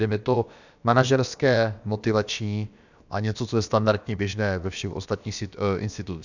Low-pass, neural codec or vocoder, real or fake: 7.2 kHz; codec, 16 kHz, about 1 kbps, DyCAST, with the encoder's durations; fake